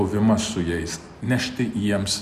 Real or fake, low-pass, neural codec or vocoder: real; 14.4 kHz; none